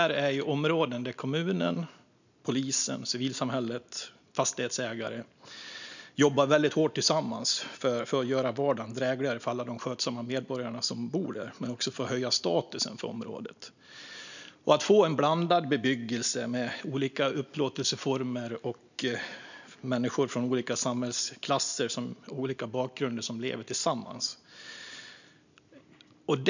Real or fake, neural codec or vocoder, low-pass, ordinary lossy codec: real; none; 7.2 kHz; none